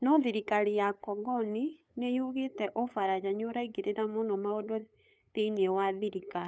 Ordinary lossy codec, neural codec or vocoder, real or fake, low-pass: none; codec, 16 kHz, 8 kbps, FunCodec, trained on LibriTTS, 25 frames a second; fake; none